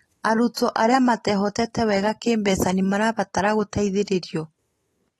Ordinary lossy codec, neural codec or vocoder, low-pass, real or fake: AAC, 32 kbps; none; 19.8 kHz; real